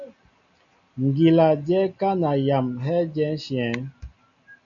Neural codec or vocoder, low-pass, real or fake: none; 7.2 kHz; real